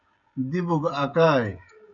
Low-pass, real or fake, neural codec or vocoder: 7.2 kHz; fake; codec, 16 kHz, 16 kbps, FreqCodec, smaller model